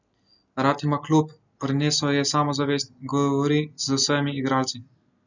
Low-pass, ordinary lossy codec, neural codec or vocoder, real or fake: 7.2 kHz; none; none; real